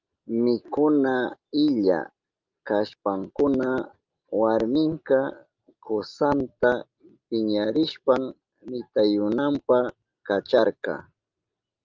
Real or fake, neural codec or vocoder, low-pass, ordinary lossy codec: real; none; 7.2 kHz; Opus, 24 kbps